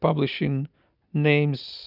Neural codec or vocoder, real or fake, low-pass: none; real; 5.4 kHz